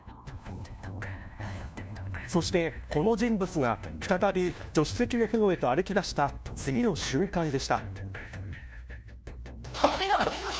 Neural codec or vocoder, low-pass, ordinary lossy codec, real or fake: codec, 16 kHz, 1 kbps, FunCodec, trained on LibriTTS, 50 frames a second; none; none; fake